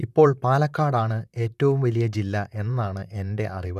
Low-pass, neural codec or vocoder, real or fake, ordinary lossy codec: 14.4 kHz; vocoder, 44.1 kHz, 128 mel bands, Pupu-Vocoder; fake; none